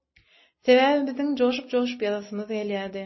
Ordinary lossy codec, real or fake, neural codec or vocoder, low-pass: MP3, 24 kbps; real; none; 7.2 kHz